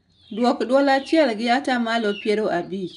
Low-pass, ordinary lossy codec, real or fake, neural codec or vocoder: 10.8 kHz; none; real; none